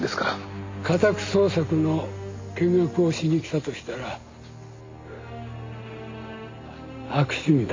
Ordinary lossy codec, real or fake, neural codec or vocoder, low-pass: MP3, 48 kbps; real; none; 7.2 kHz